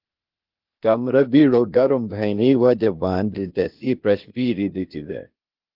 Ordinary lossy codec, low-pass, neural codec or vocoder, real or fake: Opus, 32 kbps; 5.4 kHz; codec, 16 kHz, 0.8 kbps, ZipCodec; fake